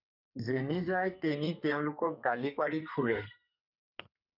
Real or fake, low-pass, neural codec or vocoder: fake; 5.4 kHz; codec, 32 kHz, 1.9 kbps, SNAC